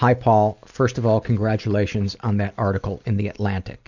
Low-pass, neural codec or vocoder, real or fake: 7.2 kHz; none; real